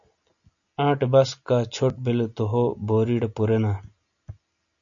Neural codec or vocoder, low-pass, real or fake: none; 7.2 kHz; real